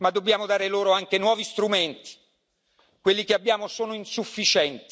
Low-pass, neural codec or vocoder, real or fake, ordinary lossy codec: none; none; real; none